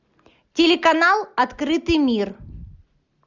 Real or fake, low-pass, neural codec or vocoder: real; 7.2 kHz; none